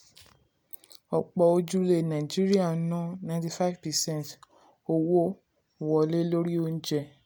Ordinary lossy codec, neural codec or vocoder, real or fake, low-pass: none; none; real; none